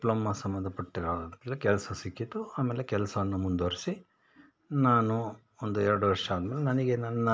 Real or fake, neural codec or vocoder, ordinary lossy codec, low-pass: real; none; none; none